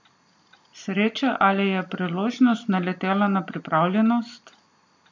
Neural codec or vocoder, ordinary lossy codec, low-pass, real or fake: none; MP3, 48 kbps; 7.2 kHz; real